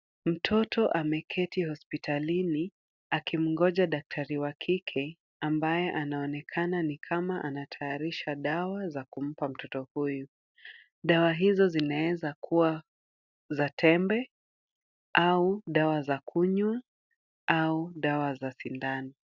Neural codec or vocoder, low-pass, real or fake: none; 7.2 kHz; real